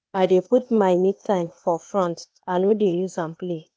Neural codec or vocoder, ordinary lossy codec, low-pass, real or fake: codec, 16 kHz, 0.8 kbps, ZipCodec; none; none; fake